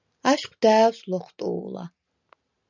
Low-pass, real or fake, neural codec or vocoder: 7.2 kHz; real; none